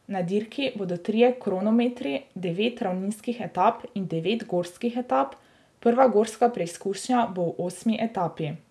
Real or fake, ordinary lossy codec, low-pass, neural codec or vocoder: real; none; none; none